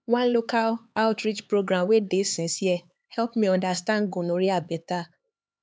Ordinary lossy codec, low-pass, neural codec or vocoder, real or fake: none; none; codec, 16 kHz, 4 kbps, X-Codec, HuBERT features, trained on LibriSpeech; fake